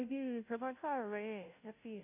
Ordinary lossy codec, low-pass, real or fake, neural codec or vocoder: none; 3.6 kHz; fake; codec, 16 kHz, 0.5 kbps, FunCodec, trained on Chinese and English, 25 frames a second